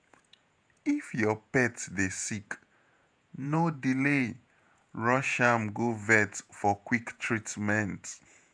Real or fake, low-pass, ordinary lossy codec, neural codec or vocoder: fake; 9.9 kHz; none; vocoder, 48 kHz, 128 mel bands, Vocos